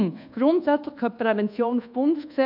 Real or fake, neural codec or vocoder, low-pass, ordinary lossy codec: fake; codec, 24 kHz, 1.2 kbps, DualCodec; 5.4 kHz; MP3, 48 kbps